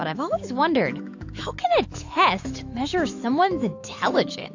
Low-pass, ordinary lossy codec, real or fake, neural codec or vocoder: 7.2 kHz; AAC, 48 kbps; fake; autoencoder, 48 kHz, 128 numbers a frame, DAC-VAE, trained on Japanese speech